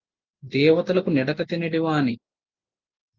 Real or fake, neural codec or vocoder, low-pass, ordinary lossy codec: real; none; 7.2 kHz; Opus, 32 kbps